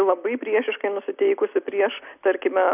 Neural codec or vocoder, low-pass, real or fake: none; 3.6 kHz; real